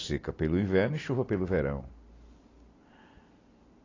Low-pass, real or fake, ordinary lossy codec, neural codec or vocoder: 7.2 kHz; real; AAC, 32 kbps; none